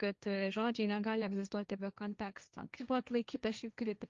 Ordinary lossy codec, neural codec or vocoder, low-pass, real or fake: Opus, 32 kbps; codec, 16 kHz, 1.1 kbps, Voila-Tokenizer; 7.2 kHz; fake